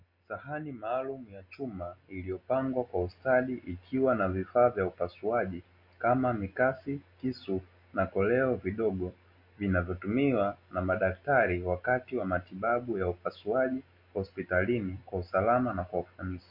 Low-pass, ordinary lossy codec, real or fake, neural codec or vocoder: 5.4 kHz; MP3, 32 kbps; real; none